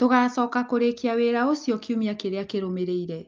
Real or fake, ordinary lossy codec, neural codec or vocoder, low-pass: real; Opus, 24 kbps; none; 7.2 kHz